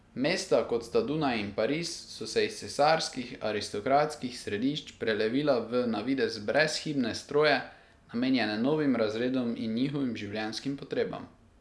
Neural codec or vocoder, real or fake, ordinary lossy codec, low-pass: none; real; none; none